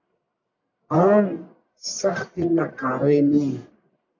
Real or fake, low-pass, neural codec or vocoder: fake; 7.2 kHz; codec, 44.1 kHz, 1.7 kbps, Pupu-Codec